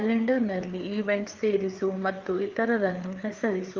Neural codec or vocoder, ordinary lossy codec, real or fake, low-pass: codec, 16 kHz, 4 kbps, FreqCodec, larger model; Opus, 32 kbps; fake; 7.2 kHz